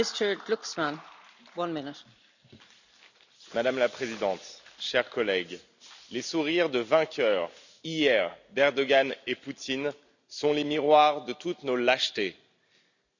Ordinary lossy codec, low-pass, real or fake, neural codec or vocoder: none; 7.2 kHz; real; none